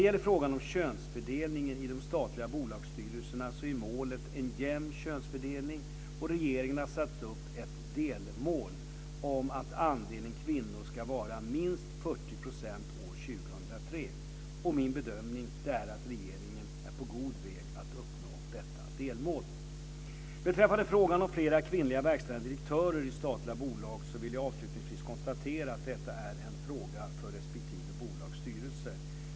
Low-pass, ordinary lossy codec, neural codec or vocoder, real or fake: none; none; none; real